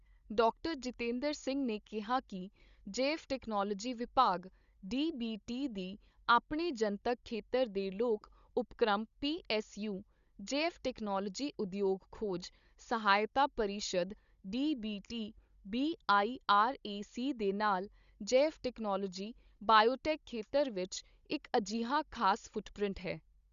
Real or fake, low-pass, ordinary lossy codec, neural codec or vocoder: fake; 7.2 kHz; Opus, 64 kbps; codec, 16 kHz, 16 kbps, FunCodec, trained on Chinese and English, 50 frames a second